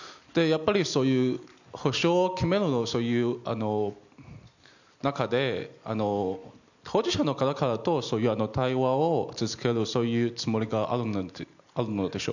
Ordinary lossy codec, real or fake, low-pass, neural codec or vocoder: none; real; 7.2 kHz; none